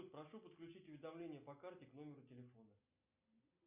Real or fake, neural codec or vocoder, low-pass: real; none; 3.6 kHz